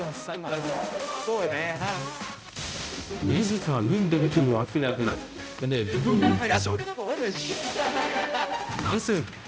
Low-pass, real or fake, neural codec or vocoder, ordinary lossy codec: none; fake; codec, 16 kHz, 0.5 kbps, X-Codec, HuBERT features, trained on balanced general audio; none